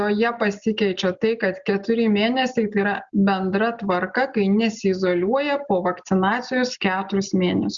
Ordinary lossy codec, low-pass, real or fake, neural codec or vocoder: Opus, 64 kbps; 7.2 kHz; real; none